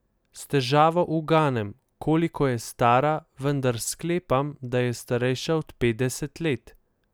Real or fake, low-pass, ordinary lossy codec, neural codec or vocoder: real; none; none; none